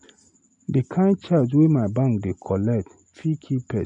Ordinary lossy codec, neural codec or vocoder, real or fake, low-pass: none; none; real; 10.8 kHz